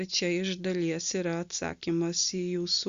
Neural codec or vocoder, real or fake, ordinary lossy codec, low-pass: none; real; Opus, 64 kbps; 7.2 kHz